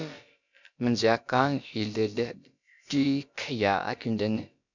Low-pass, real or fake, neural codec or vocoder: 7.2 kHz; fake; codec, 16 kHz, about 1 kbps, DyCAST, with the encoder's durations